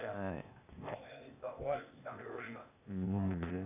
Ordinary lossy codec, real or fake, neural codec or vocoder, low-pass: AAC, 24 kbps; fake; codec, 16 kHz, 0.8 kbps, ZipCodec; 3.6 kHz